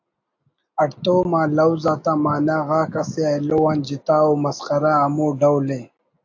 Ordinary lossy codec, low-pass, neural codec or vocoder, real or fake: AAC, 48 kbps; 7.2 kHz; none; real